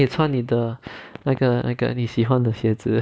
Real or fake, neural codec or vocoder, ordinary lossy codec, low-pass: real; none; none; none